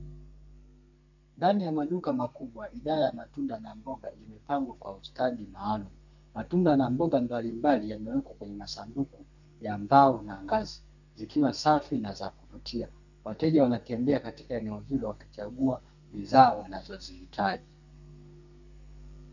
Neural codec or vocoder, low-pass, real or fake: codec, 32 kHz, 1.9 kbps, SNAC; 7.2 kHz; fake